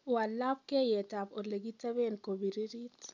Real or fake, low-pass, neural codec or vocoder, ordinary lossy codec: real; 7.2 kHz; none; none